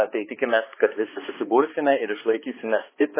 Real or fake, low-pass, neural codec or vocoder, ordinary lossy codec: fake; 3.6 kHz; codec, 16 kHz, 2 kbps, X-Codec, HuBERT features, trained on balanced general audio; MP3, 16 kbps